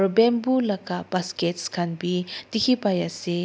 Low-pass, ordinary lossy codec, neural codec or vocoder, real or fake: none; none; none; real